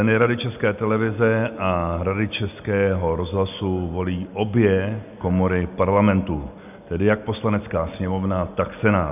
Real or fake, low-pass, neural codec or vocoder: real; 3.6 kHz; none